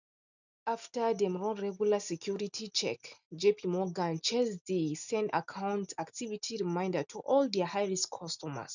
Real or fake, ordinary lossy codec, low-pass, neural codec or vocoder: real; none; 7.2 kHz; none